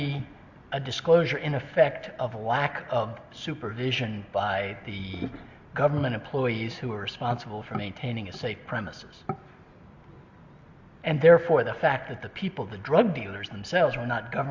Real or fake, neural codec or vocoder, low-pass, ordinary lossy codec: real; none; 7.2 kHz; Opus, 64 kbps